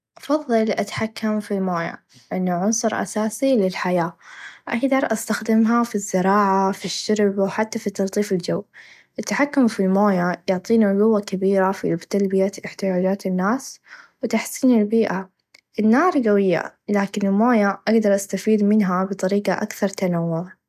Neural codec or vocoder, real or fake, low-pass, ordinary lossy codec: none; real; 14.4 kHz; none